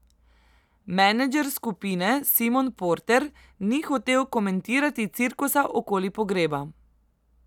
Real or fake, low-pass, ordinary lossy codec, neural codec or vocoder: real; 19.8 kHz; none; none